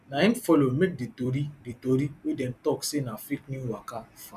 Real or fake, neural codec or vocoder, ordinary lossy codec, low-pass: fake; vocoder, 44.1 kHz, 128 mel bands every 256 samples, BigVGAN v2; none; 14.4 kHz